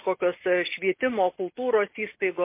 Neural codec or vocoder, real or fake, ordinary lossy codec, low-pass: none; real; MP3, 24 kbps; 3.6 kHz